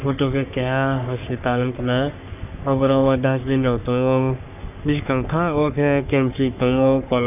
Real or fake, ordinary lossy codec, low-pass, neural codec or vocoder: fake; none; 3.6 kHz; codec, 44.1 kHz, 3.4 kbps, Pupu-Codec